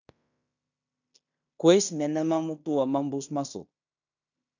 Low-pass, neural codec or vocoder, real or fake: 7.2 kHz; codec, 16 kHz in and 24 kHz out, 0.9 kbps, LongCat-Audio-Codec, fine tuned four codebook decoder; fake